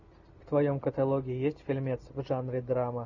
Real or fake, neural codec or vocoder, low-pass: real; none; 7.2 kHz